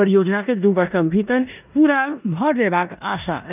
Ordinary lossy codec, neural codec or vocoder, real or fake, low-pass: none; codec, 16 kHz in and 24 kHz out, 0.9 kbps, LongCat-Audio-Codec, four codebook decoder; fake; 3.6 kHz